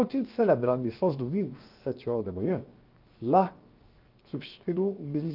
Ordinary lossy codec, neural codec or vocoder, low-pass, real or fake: Opus, 32 kbps; codec, 16 kHz, 0.7 kbps, FocalCodec; 5.4 kHz; fake